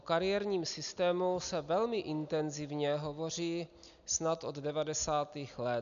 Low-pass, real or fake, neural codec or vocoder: 7.2 kHz; real; none